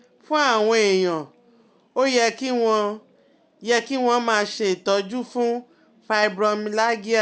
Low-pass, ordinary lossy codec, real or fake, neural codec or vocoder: none; none; real; none